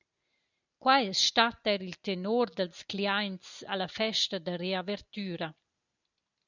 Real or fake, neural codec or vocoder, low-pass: real; none; 7.2 kHz